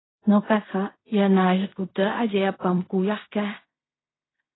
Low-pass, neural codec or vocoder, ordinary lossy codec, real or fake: 7.2 kHz; codec, 16 kHz in and 24 kHz out, 0.4 kbps, LongCat-Audio-Codec, fine tuned four codebook decoder; AAC, 16 kbps; fake